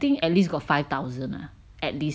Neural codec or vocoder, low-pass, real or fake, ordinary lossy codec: none; none; real; none